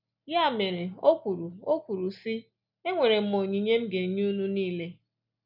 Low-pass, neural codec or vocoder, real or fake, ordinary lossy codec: 5.4 kHz; none; real; none